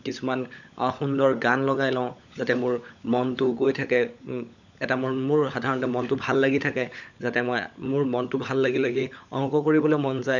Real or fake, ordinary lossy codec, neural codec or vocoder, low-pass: fake; none; codec, 16 kHz, 16 kbps, FunCodec, trained on LibriTTS, 50 frames a second; 7.2 kHz